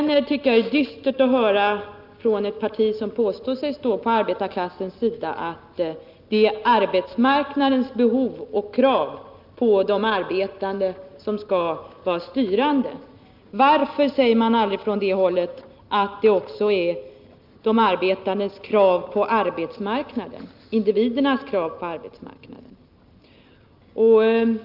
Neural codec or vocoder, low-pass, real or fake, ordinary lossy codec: none; 5.4 kHz; real; Opus, 24 kbps